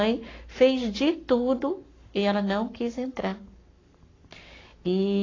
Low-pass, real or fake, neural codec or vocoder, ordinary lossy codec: 7.2 kHz; fake; codec, 44.1 kHz, 7.8 kbps, Pupu-Codec; AAC, 32 kbps